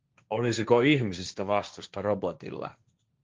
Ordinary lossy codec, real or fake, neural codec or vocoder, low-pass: Opus, 24 kbps; fake; codec, 16 kHz, 1.1 kbps, Voila-Tokenizer; 7.2 kHz